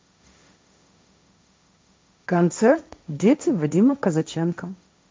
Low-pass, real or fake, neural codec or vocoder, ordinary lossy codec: none; fake; codec, 16 kHz, 1.1 kbps, Voila-Tokenizer; none